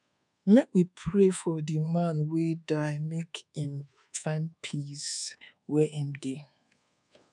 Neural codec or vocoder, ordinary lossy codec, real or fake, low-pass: codec, 24 kHz, 1.2 kbps, DualCodec; none; fake; 10.8 kHz